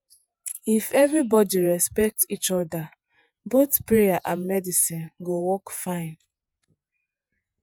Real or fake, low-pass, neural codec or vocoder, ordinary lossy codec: fake; none; vocoder, 48 kHz, 128 mel bands, Vocos; none